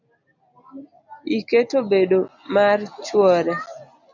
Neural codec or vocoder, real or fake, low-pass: none; real; 7.2 kHz